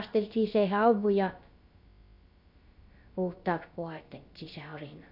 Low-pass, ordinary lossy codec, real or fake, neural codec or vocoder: 5.4 kHz; MP3, 48 kbps; fake; codec, 16 kHz, about 1 kbps, DyCAST, with the encoder's durations